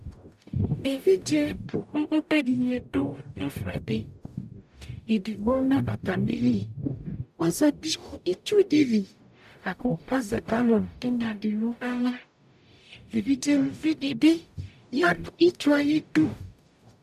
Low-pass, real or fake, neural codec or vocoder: 14.4 kHz; fake; codec, 44.1 kHz, 0.9 kbps, DAC